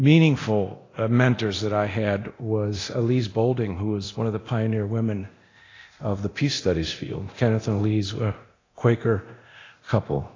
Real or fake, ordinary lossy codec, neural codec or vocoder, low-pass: fake; AAC, 32 kbps; codec, 24 kHz, 0.9 kbps, DualCodec; 7.2 kHz